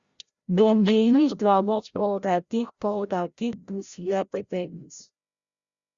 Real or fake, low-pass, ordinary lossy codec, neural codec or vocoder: fake; 7.2 kHz; Opus, 64 kbps; codec, 16 kHz, 0.5 kbps, FreqCodec, larger model